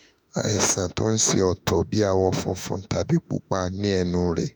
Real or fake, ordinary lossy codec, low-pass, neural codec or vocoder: fake; none; none; autoencoder, 48 kHz, 32 numbers a frame, DAC-VAE, trained on Japanese speech